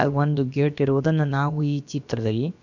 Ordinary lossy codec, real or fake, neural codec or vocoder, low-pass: none; fake; codec, 16 kHz, about 1 kbps, DyCAST, with the encoder's durations; 7.2 kHz